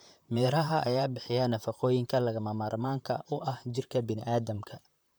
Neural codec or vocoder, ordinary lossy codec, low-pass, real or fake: vocoder, 44.1 kHz, 128 mel bands, Pupu-Vocoder; none; none; fake